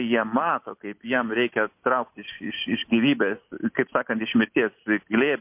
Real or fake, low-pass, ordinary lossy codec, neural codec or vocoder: real; 3.6 kHz; MP3, 32 kbps; none